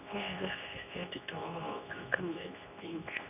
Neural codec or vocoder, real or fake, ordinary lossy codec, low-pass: codec, 24 kHz, 0.9 kbps, WavTokenizer, medium speech release version 2; fake; none; 3.6 kHz